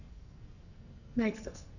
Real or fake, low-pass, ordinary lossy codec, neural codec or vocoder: fake; 7.2 kHz; none; codec, 44.1 kHz, 2.6 kbps, SNAC